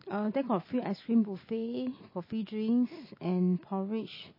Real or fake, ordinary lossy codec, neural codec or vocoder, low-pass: real; MP3, 24 kbps; none; 5.4 kHz